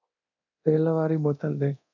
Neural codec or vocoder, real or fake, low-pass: codec, 24 kHz, 0.9 kbps, DualCodec; fake; 7.2 kHz